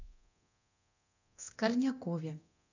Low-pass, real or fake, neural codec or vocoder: 7.2 kHz; fake; codec, 24 kHz, 0.9 kbps, DualCodec